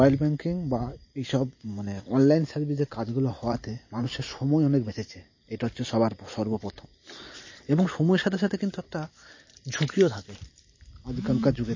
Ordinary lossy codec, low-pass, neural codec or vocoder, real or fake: MP3, 32 kbps; 7.2 kHz; vocoder, 44.1 kHz, 128 mel bands every 512 samples, BigVGAN v2; fake